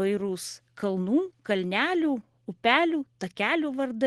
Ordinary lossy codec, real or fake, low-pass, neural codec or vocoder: Opus, 16 kbps; real; 10.8 kHz; none